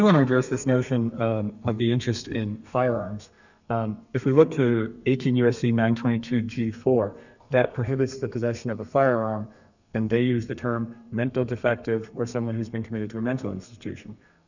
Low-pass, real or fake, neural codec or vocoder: 7.2 kHz; fake; codec, 32 kHz, 1.9 kbps, SNAC